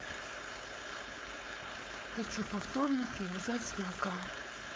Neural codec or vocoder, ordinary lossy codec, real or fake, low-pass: codec, 16 kHz, 4.8 kbps, FACodec; none; fake; none